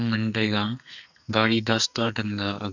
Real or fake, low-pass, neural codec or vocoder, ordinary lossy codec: fake; 7.2 kHz; codec, 44.1 kHz, 2.6 kbps, SNAC; none